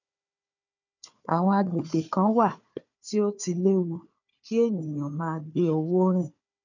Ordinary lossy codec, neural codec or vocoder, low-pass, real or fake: none; codec, 16 kHz, 4 kbps, FunCodec, trained on Chinese and English, 50 frames a second; 7.2 kHz; fake